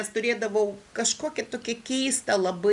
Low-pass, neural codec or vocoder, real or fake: 10.8 kHz; none; real